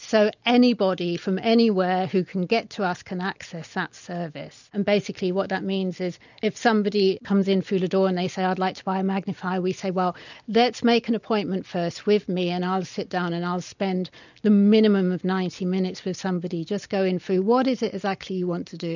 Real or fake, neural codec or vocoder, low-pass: real; none; 7.2 kHz